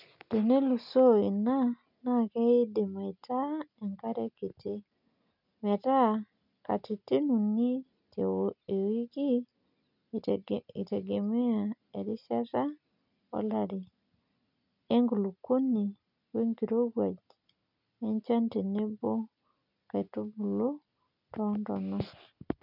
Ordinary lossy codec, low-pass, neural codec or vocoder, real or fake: none; 5.4 kHz; none; real